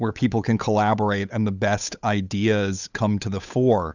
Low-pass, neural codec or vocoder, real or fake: 7.2 kHz; codec, 16 kHz, 8 kbps, FunCodec, trained on Chinese and English, 25 frames a second; fake